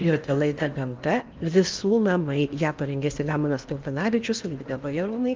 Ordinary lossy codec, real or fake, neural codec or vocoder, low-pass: Opus, 32 kbps; fake; codec, 16 kHz in and 24 kHz out, 0.8 kbps, FocalCodec, streaming, 65536 codes; 7.2 kHz